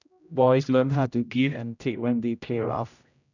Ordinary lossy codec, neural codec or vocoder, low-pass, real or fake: none; codec, 16 kHz, 0.5 kbps, X-Codec, HuBERT features, trained on general audio; 7.2 kHz; fake